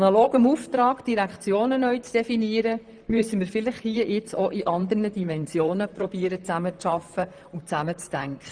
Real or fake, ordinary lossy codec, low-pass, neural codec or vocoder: fake; Opus, 24 kbps; 9.9 kHz; vocoder, 44.1 kHz, 128 mel bands, Pupu-Vocoder